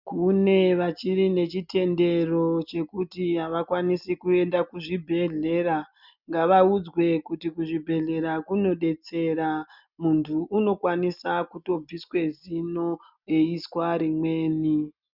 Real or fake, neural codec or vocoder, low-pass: real; none; 5.4 kHz